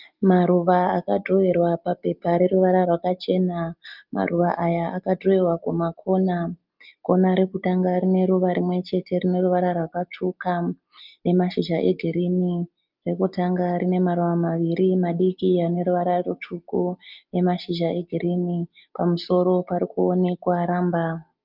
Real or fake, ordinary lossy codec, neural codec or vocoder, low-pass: real; Opus, 24 kbps; none; 5.4 kHz